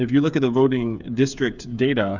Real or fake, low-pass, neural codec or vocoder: fake; 7.2 kHz; codec, 16 kHz, 8 kbps, FreqCodec, smaller model